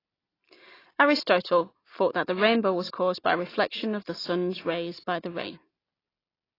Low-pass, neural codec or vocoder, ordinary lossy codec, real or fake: 5.4 kHz; none; AAC, 24 kbps; real